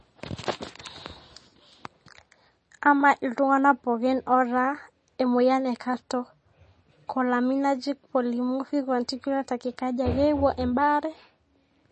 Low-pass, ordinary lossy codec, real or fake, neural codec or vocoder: 10.8 kHz; MP3, 32 kbps; real; none